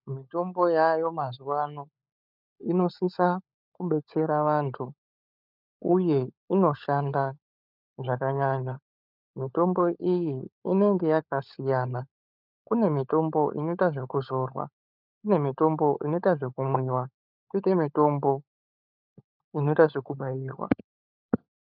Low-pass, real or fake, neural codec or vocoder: 5.4 kHz; fake; codec, 16 kHz, 16 kbps, FunCodec, trained on LibriTTS, 50 frames a second